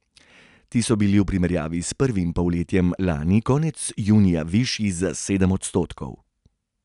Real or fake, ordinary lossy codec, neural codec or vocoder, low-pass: real; none; none; 10.8 kHz